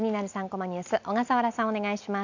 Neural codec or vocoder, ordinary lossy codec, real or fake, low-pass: none; none; real; 7.2 kHz